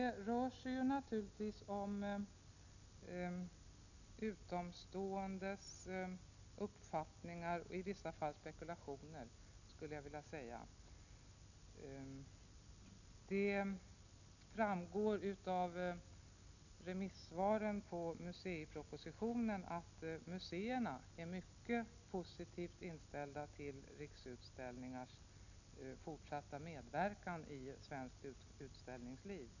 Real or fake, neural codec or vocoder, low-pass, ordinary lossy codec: real; none; 7.2 kHz; none